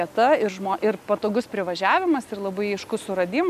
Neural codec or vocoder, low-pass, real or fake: none; 14.4 kHz; real